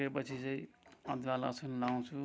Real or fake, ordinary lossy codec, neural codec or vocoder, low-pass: real; none; none; none